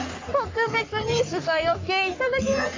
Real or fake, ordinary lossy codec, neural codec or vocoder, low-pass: fake; AAC, 32 kbps; codec, 44.1 kHz, 3.4 kbps, Pupu-Codec; 7.2 kHz